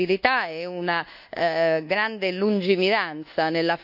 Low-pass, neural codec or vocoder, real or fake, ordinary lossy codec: 5.4 kHz; codec, 24 kHz, 1.2 kbps, DualCodec; fake; none